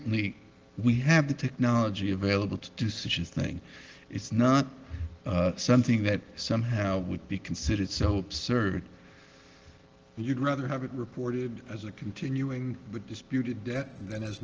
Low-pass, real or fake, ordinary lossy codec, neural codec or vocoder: 7.2 kHz; real; Opus, 16 kbps; none